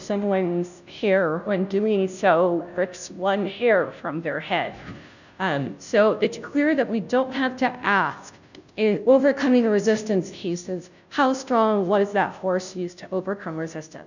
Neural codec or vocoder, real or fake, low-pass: codec, 16 kHz, 0.5 kbps, FunCodec, trained on Chinese and English, 25 frames a second; fake; 7.2 kHz